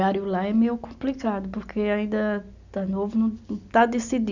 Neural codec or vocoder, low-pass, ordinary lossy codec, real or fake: none; 7.2 kHz; none; real